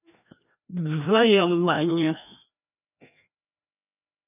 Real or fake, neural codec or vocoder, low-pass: fake; codec, 16 kHz, 1 kbps, FreqCodec, larger model; 3.6 kHz